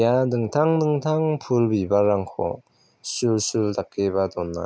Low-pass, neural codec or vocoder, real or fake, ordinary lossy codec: none; none; real; none